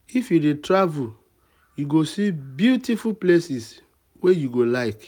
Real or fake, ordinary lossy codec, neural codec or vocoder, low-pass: real; none; none; 19.8 kHz